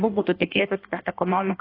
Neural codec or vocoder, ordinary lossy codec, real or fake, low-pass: codec, 16 kHz in and 24 kHz out, 1.1 kbps, FireRedTTS-2 codec; AAC, 24 kbps; fake; 5.4 kHz